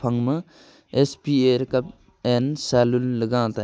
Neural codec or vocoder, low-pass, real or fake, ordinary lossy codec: none; none; real; none